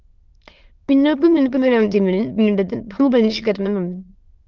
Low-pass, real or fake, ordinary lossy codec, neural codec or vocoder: 7.2 kHz; fake; Opus, 24 kbps; autoencoder, 22.05 kHz, a latent of 192 numbers a frame, VITS, trained on many speakers